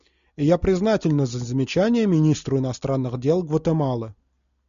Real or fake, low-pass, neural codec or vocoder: real; 7.2 kHz; none